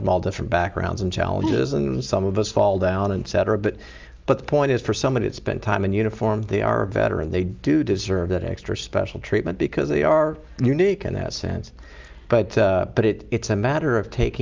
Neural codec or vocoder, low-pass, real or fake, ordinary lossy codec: none; 7.2 kHz; real; Opus, 32 kbps